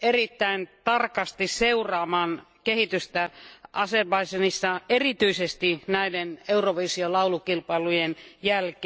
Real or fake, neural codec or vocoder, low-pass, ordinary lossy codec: real; none; none; none